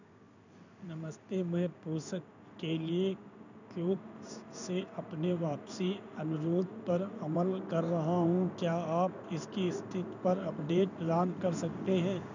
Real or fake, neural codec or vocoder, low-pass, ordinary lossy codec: fake; codec, 16 kHz in and 24 kHz out, 1 kbps, XY-Tokenizer; 7.2 kHz; none